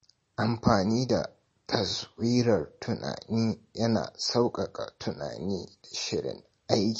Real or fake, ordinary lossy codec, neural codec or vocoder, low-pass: real; MP3, 32 kbps; none; 10.8 kHz